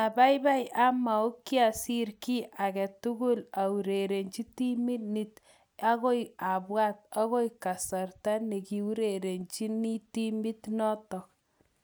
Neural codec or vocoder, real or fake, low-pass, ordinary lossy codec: none; real; none; none